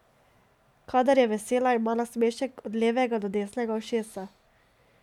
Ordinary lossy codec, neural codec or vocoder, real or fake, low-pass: none; none; real; 19.8 kHz